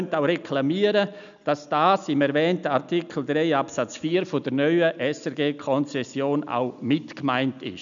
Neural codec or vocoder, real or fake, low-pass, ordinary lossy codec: none; real; 7.2 kHz; none